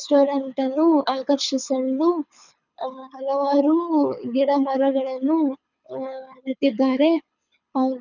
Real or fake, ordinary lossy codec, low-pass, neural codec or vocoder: fake; none; 7.2 kHz; codec, 24 kHz, 6 kbps, HILCodec